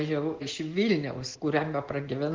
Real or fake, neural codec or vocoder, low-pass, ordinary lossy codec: real; none; 7.2 kHz; Opus, 16 kbps